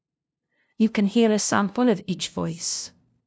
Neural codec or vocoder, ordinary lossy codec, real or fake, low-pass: codec, 16 kHz, 0.5 kbps, FunCodec, trained on LibriTTS, 25 frames a second; none; fake; none